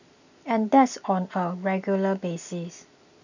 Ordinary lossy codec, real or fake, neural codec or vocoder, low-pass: none; real; none; 7.2 kHz